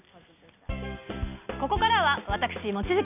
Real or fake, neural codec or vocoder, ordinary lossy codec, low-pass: real; none; none; 3.6 kHz